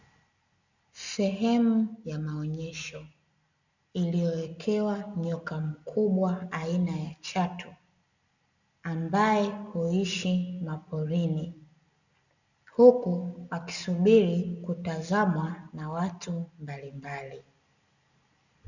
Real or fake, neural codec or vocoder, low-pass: real; none; 7.2 kHz